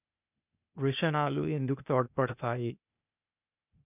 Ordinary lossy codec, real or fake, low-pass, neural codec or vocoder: none; fake; 3.6 kHz; codec, 16 kHz, 0.8 kbps, ZipCodec